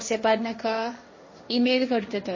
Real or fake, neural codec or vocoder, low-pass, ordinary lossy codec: fake; codec, 16 kHz, 1.1 kbps, Voila-Tokenizer; 7.2 kHz; MP3, 32 kbps